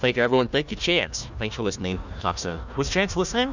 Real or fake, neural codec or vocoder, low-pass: fake; codec, 16 kHz, 1 kbps, FunCodec, trained on Chinese and English, 50 frames a second; 7.2 kHz